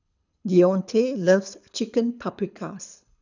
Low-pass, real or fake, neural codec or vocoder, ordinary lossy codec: 7.2 kHz; fake; codec, 24 kHz, 6 kbps, HILCodec; none